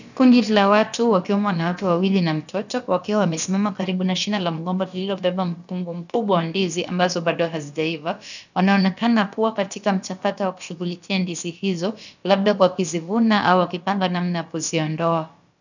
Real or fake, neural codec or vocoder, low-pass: fake; codec, 16 kHz, about 1 kbps, DyCAST, with the encoder's durations; 7.2 kHz